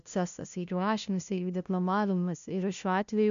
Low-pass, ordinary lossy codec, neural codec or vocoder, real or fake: 7.2 kHz; MP3, 64 kbps; codec, 16 kHz, 0.5 kbps, FunCodec, trained on LibriTTS, 25 frames a second; fake